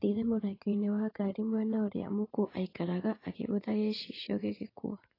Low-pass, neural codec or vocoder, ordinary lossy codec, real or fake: 5.4 kHz; vocoder, 44.1 kHz, 80 mel bands, Vocos; AAC, 24 kbps; fake